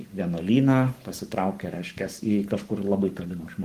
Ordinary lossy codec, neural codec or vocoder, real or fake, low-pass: Opus, 24 kbps; codec, 44.1 kHz, 7.8 kbps, Pupu-Codec; fake; 14.4 kHz